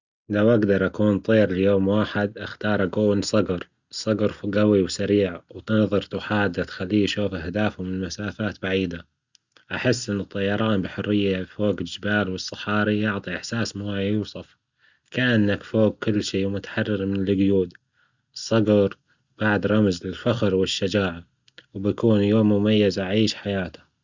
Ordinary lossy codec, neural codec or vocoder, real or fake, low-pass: Opus, 64 kbps; none; real; 7.2 kHz